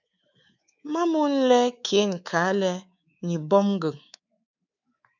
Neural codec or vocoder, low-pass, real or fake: codec, 24 kHz, 3.1 kbps, DualCodec; 7.2 kHz; fake